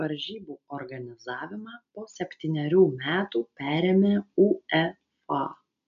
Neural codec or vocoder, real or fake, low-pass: none; real; 7.2 kHz